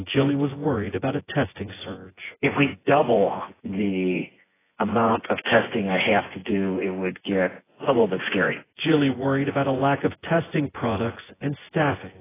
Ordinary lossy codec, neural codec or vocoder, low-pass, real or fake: AAC, 16 kbps; vocoder, 24 kHz, 100 mel bands, Vocos; 3.6 kHz; fake